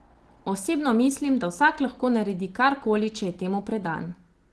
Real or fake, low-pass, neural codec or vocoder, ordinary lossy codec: real; 10.8 kHz; none; Opus, 16 kbps